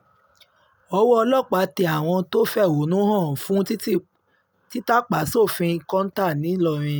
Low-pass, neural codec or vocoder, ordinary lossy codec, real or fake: none; none; none; real